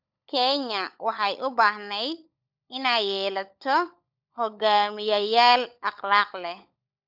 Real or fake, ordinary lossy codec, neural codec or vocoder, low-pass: fake; none; codec, 16 kHz, 16 kbps, FunCodec, trained on LibriTTS, 50 frames a second; 5.4 kHz